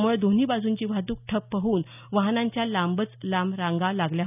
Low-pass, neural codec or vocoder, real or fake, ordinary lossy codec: 3.6 kHz; none; real; none